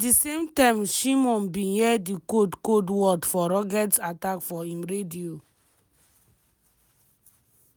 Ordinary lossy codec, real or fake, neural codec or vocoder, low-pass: none; real; none; none